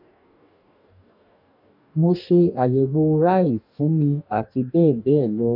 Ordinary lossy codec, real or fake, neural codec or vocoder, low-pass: none; fake; codec, 44.1 kHz, 2.6 kbps, DAC; 5.4 kHz